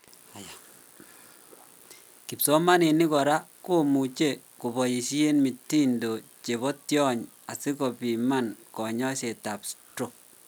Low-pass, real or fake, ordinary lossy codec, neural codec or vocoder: none; real; none; none